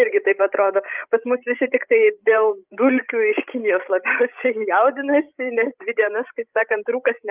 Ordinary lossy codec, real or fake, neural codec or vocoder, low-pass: Opus, 24 kbps; fake; codec, 16 kHz, 16 kbps, FreqCodec, larger model; 3.6 kHz